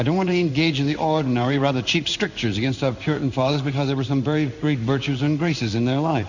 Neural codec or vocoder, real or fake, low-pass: codec, 16 kHz in and 24 kHz out, 1 kbps, XY-Tokenizer; fake; 7.2 kHz